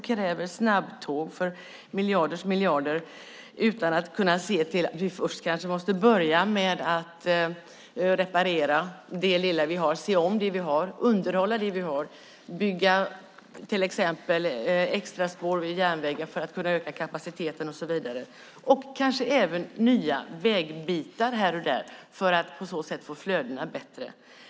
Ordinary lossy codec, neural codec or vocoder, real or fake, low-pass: none; none; real; none